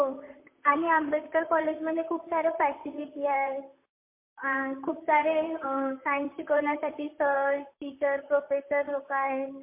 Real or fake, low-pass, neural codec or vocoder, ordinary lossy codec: fake; 3.6 kHz; vocoder, 44.1 kHz, 128 mel bands, Pupu-Vocoder; MP3, 24 kbps